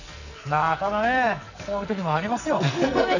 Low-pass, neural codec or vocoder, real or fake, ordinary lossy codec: 7.2 kHz; codec, 44.1 kHz, 2.6 kbps, SNAC; fake; none